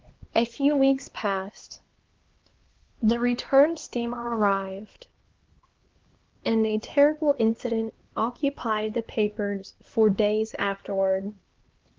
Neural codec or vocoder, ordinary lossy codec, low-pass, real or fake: codec, 16 kHz, 2 kbps, X-Codec, HuBERT features, trained on LibriSpeech; Opus, 16 kbps; 7.2 kHz; fake